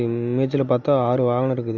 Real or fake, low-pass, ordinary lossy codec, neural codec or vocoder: real; 7.2 kHz; none; none